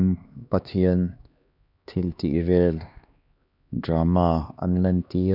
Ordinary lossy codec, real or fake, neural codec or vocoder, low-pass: none; fake; codec, 16 kHz, 2 kbps, X-Codec, HuBERT features, trained on LibriSpeech; 5.4 kHz